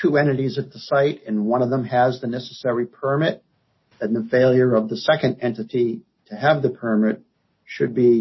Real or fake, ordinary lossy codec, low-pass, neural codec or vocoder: real; MP3, 24 kbps; 7.2 kHz; none